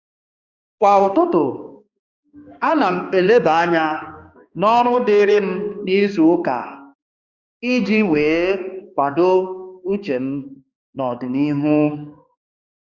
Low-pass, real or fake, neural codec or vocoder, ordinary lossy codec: 7.2 kHz; fake; codec, 16 kHz, 2 kbps, X-Codec, HuBERT features, trained on balanced general audio; Opus, 64 kbps